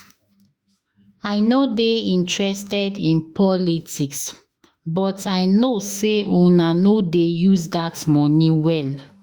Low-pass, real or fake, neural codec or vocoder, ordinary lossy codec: 19.8 kHz; fake; autoencoder, 48 kHz, 32 numbers a frame, DAC-VAE, trained on Japanese speech; Opus, 64 kbps